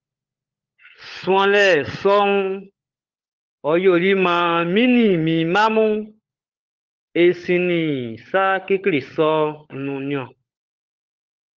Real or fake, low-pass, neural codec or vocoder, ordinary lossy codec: fake; 7.2 kHz; codec, 16 kHz, 16 kbps, FunCodec, trained on LibriTTS, 50 frames a second; Opus, 32 kbps